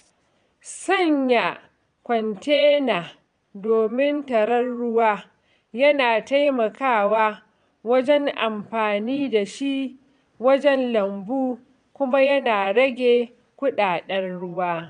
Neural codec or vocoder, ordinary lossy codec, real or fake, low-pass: vocoder, 22.05 kHz, 80 mel bands, Vocos; none; fake; 9.9 kHz